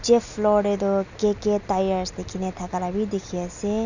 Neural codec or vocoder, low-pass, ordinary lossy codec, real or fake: none; 7.2 kHz; none; real